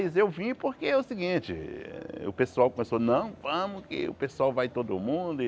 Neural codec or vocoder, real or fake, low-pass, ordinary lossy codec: none; real; none; none